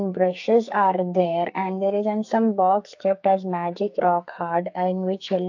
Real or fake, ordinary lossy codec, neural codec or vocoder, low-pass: fake; AAC, 48 kbps; codec, 44.1 kHz, 2.6 kbps, SNAC; 7.2 kHz